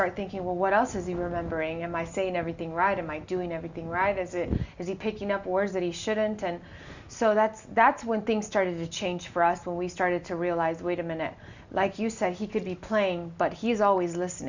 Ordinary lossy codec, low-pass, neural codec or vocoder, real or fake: Opus, 64 kbps; 7.2 kHz; none; real